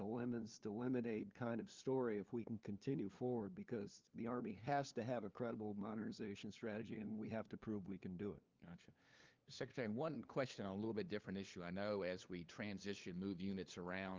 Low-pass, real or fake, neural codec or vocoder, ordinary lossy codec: 7.2 kHz; fake; codec, 16 kHz, 2 kbps, FunCodec, trained on LibriTTS, 25 frames a second; Opus, 32 kbps